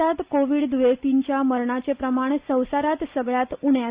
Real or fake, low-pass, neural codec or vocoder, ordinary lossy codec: real; 3.6 kHz; none; Opus, 64 kbps